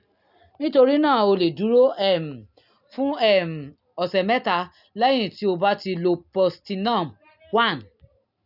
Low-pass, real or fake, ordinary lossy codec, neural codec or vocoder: 5.4 kHz; real; none; none